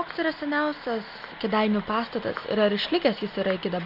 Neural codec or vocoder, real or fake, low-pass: none; real; 5.4 kHz